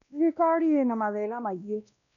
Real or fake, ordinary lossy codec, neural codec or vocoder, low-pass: fake; none; codec, 16 kHz, 1 kbps, X-Codec, WavLM features, trained on Multilingual LibriSpeech; 7.2 kHz